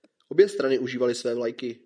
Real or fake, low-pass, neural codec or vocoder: real; 9.9 kHz; none